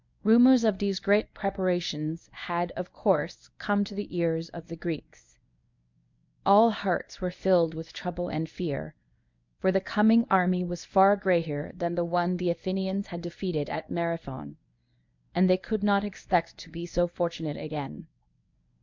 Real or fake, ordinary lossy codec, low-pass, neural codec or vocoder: fake; MP3, 64 kbps; 7.2 kHz; codec, 24 kHz, 0.9 kbps, WavTokenizer, medium speech release version 1